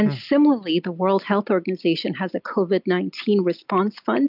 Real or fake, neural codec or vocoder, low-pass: real; none; 5.4 kHz